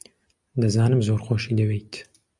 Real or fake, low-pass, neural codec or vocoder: real; 10.8 kHz; none